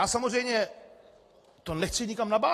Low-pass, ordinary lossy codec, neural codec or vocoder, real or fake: 14.4 kHz; AAC, 48 kbps; none; real